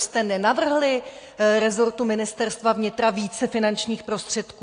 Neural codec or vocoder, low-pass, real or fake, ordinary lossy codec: none; 9.9 kHz; real; AAC, 48 kbps